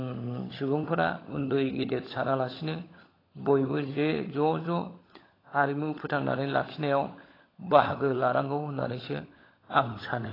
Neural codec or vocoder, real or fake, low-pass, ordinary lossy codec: codec, 16 kHz, 16 kbps, FunCodec, trained on LibriTTS, 50 frames a second; fake; 5.4 kHz; AAC, 24 kbps